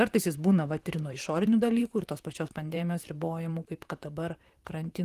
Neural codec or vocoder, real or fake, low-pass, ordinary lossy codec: vocoder, 44.1 kHz, 128 mel bands, Pupu-Vocoder; fake; 14.4 kHz; Opus, 24 kbps